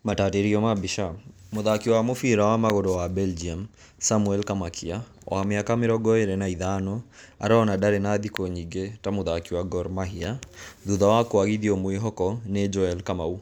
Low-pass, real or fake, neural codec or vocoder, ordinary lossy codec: none; real; none; none